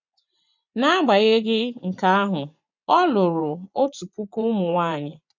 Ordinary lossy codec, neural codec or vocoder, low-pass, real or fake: none; vocoder, 24 kHz, 100 mel bands, Vocos; 7.2 kHz; fake